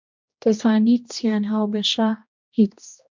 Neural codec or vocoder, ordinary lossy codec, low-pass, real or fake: codec, 16 kHz, 1 kbps, X-Codec, HuBERT features, trained on general audio; MP3, 64 kbps; 7.2 kHz; fake